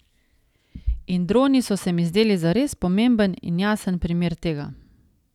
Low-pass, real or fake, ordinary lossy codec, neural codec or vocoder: 19.8 kHz; real; none; none